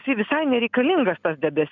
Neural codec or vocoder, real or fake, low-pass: none; real; 7.2 kHz